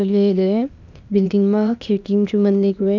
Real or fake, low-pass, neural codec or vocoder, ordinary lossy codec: fake; 7.2 kHz; codec, 16 kHz, 0.8 kbps, ZipCodec; none